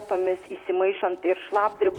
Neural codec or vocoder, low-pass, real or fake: vocoder, 44.1 kHz, 128 mel bands, Pupu-Vocoder; 19.8 kHz; fake